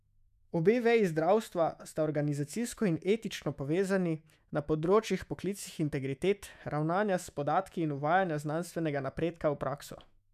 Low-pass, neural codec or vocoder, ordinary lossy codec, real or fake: 14.4 kHz; autoencoder, 48 kHz, 128 numbers a frame, DAC-VAE, trained on Japanese speech; none; fake